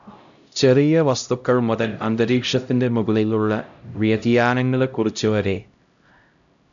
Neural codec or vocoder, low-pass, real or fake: codec, 16 kHz, 0.5 kbps, X-Codec, HuBERT features, trained on LibriSpeech; 7.2 kHz; fake